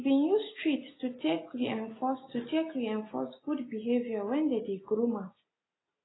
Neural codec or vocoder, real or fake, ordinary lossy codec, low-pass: none; real; AAC, 16 kbps; 7.2 kHz